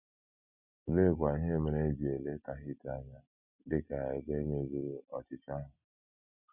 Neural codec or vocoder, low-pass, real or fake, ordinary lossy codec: none; 3.6 kHz; real; none